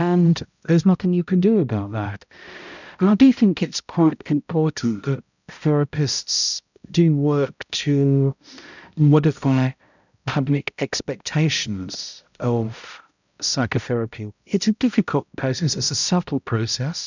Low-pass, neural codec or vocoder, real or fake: 7.2 kHz; codec, 16 kHz, 0.5 kbps, X-Codec, HuBERT features, trained on balanced general audio; fake